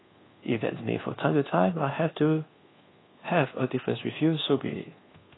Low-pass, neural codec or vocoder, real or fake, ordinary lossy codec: 7.2 kHz; codec, 24 kHz, 1.2 kbps, DualCodec; fake; AAC, 16 kbps